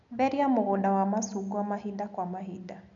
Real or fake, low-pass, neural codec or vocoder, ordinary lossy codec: real; 7.2 kHz; none; none